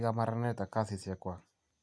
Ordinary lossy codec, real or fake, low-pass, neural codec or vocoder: none; real; none; none